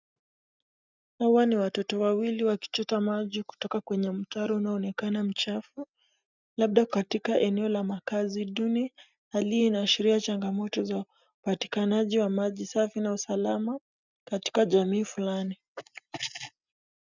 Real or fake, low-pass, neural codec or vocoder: real; 7.2 kHz; none